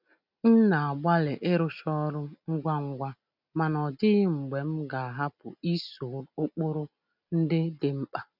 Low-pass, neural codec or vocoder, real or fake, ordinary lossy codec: 5.4 kHz; none; real; none